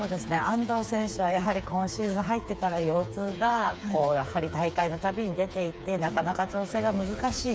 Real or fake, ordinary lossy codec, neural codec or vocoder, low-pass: fake; none; codec, 16 kHz, 4 kbps, FreqCodec, smaller model; none